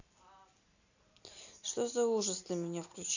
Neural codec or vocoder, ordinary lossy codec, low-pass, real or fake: none; none; 7.2 kHz; real